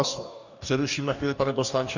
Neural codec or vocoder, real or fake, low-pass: codec, 44.1 kHz, 2.6 kbps, DAC; fake; 7.2 kHz